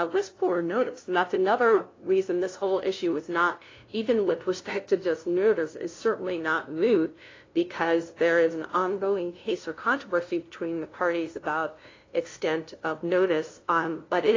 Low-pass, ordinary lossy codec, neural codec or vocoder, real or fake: 7.2 kHz; AAC, 32 kbps; codec, 16 kHz, 0.5 kbps, FunCodec, trained on LibriTTS, 25 frames a second; fake